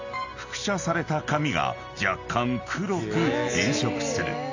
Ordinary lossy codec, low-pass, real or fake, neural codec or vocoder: AAC, 48 kbps; 7.2 kHz; real; none